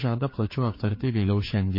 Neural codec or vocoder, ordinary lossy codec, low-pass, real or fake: codec, 16 kHz, 2 kbps, FreqCodec, larger model; MP3, 24 kbps; 5.4 kHz; fake